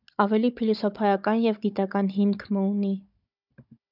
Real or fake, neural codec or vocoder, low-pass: fake; codec, 16 kHz, 16 kbps, FunCodec, trained on Chinese and English, 50 frames a second; 5.4 kHz